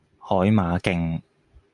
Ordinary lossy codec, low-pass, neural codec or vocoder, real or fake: MP3, 96 kbps; 10.8 kHz; none; real